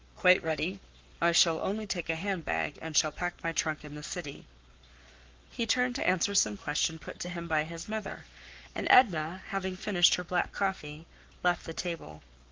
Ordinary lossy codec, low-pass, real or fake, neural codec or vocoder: Opus, 32 kbps; 7.2 kHz; fake; codec, 44.1 kHz, 7.8 kbps, Pupu-Codec